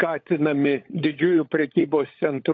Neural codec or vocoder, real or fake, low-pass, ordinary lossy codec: codec, 16 kHz, 16 kbps, FunCodec, trained on LibriTTS, 50 frames a second; fake; 7.2 kHz; AAC, 48 kbps